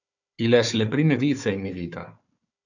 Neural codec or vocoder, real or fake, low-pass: codec, 16 kHz, 4 kbps, FunCodec, trained on Chinese and English, 50 frames a second; fake; 7.2 kHz